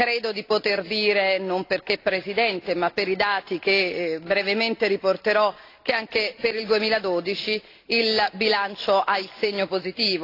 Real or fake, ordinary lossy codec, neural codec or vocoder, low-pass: real; AAC, 32 kbps; none; 5.4 kHz